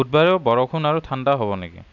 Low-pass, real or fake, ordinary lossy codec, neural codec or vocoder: 7.2 kHz; real; none; none